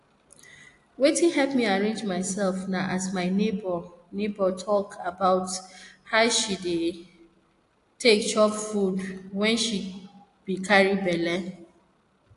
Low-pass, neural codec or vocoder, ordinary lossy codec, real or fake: 10.8 kHz; none; AAC, 48 kbps; real